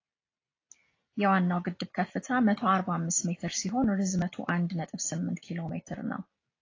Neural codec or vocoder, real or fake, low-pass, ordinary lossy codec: none; real; 7.2 kHz; AAC, 32 kbps